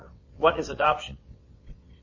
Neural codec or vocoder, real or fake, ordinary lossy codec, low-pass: vocoder, 22.05 kHz, 80 mel bands, WaveNeXt; fake; MP3, 32 kbps; 7.2 kHz